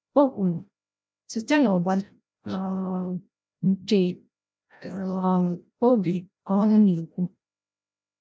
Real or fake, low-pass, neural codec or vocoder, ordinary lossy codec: fake; none; codec, 16 kHz, 0.5 kbps, FreqCodec, larger model; none